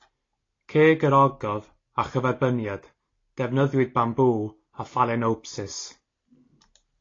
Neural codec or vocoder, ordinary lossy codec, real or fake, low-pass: none; AAC, 32 kbps; real; 7.2 kHz